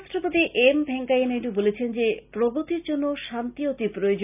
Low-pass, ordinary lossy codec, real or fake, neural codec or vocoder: 3.6 kHz; none; real; none